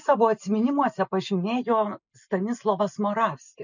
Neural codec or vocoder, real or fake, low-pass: none; real; 7.2 kHz